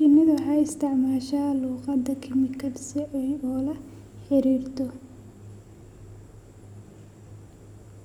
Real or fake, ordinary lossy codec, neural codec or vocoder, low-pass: real; none; none; 19.8 kHz